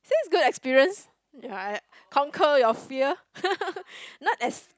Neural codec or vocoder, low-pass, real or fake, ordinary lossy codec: none; none; real; none